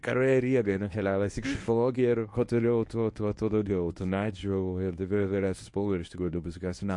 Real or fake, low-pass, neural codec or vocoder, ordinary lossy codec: fake; 10.8 kHz; codec, 24 kHz, 0.9 kbps, WavTokenizer, medium speech release version 1; MP3, 48 kbps